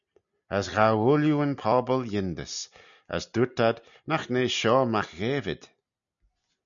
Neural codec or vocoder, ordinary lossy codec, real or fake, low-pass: none; MP3, 96 kbps; real; 7.2 kHz